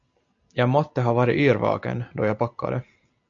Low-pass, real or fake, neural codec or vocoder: 7.2 kHz; real; none